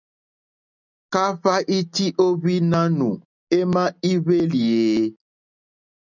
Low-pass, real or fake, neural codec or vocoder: 7.2 kHz; real; none